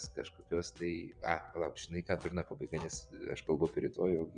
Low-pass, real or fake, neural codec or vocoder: 9.9 kHz; fake; vocoder, 22.05 kHz, 80 mel bands, WaveNeXt